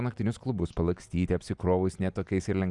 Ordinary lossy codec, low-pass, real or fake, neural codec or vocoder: Opus, 64 kbps; 10.8 kHz; real; none